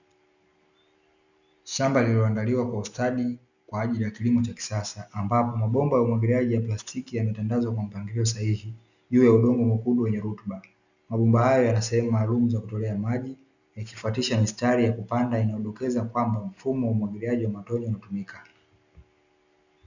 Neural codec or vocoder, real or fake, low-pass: none; real; 7.2 kHz